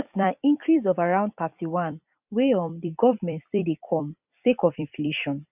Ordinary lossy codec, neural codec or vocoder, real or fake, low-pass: none; vocoder, 44.1 kHz, 128 mel bands every 256 samples, BigVGAN v2; fake; 3.6 kHz